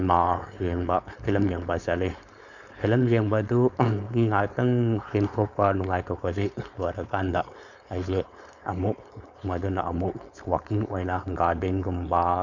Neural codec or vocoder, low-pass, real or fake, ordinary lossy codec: codec, 16 kHz, 4.8 kbps, FACodec; 7.2 kHz; fake; none